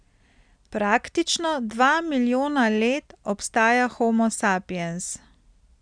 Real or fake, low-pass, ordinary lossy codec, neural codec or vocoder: real; 9.9 kHz; none; none